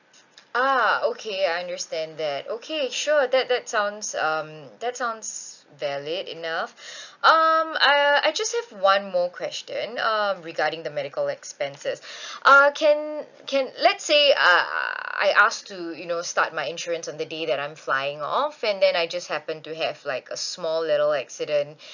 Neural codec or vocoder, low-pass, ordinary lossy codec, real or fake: none; 7.2 kHz; none; real